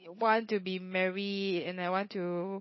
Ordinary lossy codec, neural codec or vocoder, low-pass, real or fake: MP3, 24 kbps; codec, 16 kHz, 8 kbps, FunCodec, trained on Chinese and English, 25 frames a second; 7.2 kHz; fake